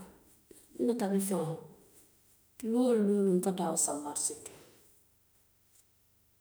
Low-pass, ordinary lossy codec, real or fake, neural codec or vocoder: none; none; fake; autoencoder, 48 kHz, 32 numbers a frame, DAC-VAE, trained on Japanese speech